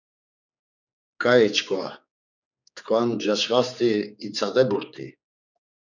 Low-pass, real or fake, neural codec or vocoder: 7.2 kHz; fake; codec, 16 kHz, 4 kbps, X-Codec, HuBERT features, trained on general audio